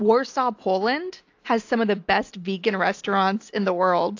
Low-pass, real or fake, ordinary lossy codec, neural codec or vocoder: 7.2 kHz; real; AAC, 48 kbps; none